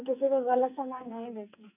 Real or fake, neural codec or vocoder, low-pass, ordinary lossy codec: fake; codec, 44.1 kHz, 2.6 kbps, SNAC; 3.6 kHz; none